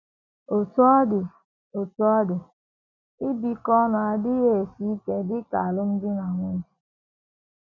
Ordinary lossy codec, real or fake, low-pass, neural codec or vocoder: none; real; 7.2 kHz; none